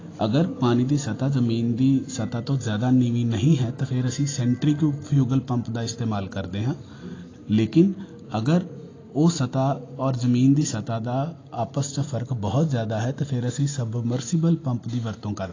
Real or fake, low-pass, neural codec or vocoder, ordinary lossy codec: real; 7.2 kHz; none; AAC, 32 kbps